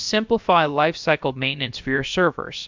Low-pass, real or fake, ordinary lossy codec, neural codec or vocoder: 7.2 kHz; fake; MP3, 64 kbps; codec, 16 kHz, about 1 kbps, DyCAST, with the encoder's durations